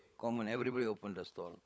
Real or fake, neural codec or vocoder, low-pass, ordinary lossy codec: fake; codec, 16 kHz, 16 kbps, FunCodec, trained on LibriTTS, 50 frames a second; none; none